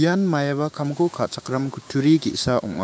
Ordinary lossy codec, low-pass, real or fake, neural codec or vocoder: none; none; fake; codec, 16 kHz, 6 kbps, DAC